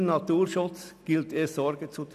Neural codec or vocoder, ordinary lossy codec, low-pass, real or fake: none; none; 14.4 kHz; real